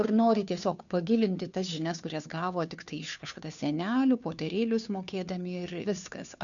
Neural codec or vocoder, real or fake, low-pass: codec, 16 kHz, 2 kbps, FunCodec, trained on Chinese and English, 25 frames a second; fake; 7.2 kHz